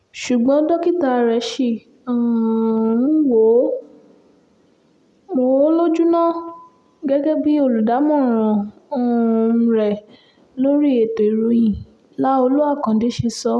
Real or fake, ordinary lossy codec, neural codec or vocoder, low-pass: real; none; none; none